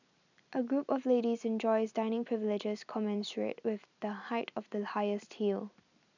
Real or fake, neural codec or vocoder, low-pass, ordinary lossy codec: real; none; 7.2 kHz; none